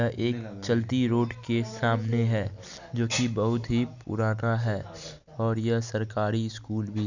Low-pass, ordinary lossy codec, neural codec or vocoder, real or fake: 7.2 kHz; none; none; real